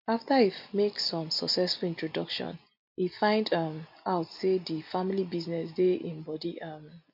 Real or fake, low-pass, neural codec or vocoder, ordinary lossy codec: real; 5.4 kHz; none; MP3, 48 kbps